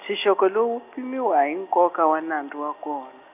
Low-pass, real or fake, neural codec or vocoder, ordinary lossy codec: 3.6 kHz; real; none; none